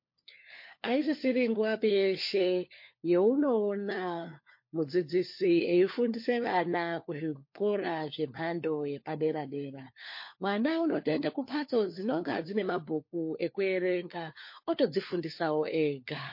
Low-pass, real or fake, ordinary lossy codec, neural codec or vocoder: 5.4 kHz; fake; MP3, 32 kbps; codec, 16 kHz, 4 kbps, FunCodec, trained on LibriTTS, 50 frames a second